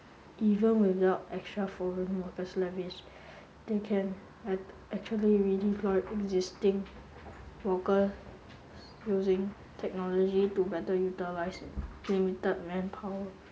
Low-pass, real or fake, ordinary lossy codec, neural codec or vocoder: none; real; none; none